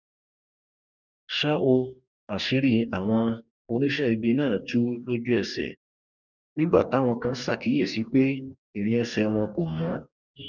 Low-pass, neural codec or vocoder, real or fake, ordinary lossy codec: 7.2 kHz; codec, 44.1 kHz, 2.6 kbps, DAC; fake; none